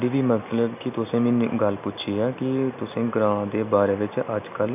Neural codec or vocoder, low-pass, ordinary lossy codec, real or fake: none; 3.6 kHz; none; real